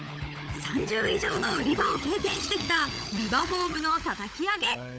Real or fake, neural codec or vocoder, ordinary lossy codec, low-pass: fake; codec, 16 kHz, 16 kbps, FunCodec, trained on LibriTTS, 50 frames a second; none; none